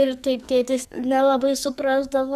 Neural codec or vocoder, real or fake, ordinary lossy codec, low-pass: codec, 44.1 kHz, 2.6 kbps, SNAC; fake; Opus, 64 kbps; 14.4 kHz